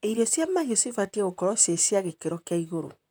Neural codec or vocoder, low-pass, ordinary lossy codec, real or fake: vocoder, 44.1 kHz, 128 mel bands, Pupu-Vocoder; none; none; fake